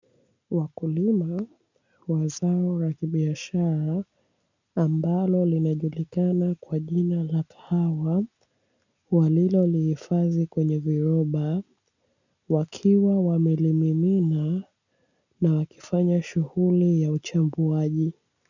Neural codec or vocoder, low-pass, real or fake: none; 7.2 kHz; real